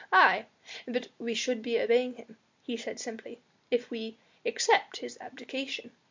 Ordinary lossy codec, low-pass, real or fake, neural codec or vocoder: MP3, 64 kbps; 7.2 kHz; real; none